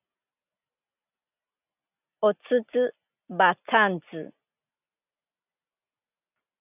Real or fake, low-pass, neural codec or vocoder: real; 3.6 kHz; none